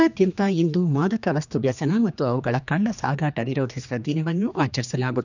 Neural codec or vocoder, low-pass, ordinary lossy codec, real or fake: codec, 16 kHz, 2 kbps, X-Codec, HuBERT features, trained on general audio; 7.2 kHz; none; fake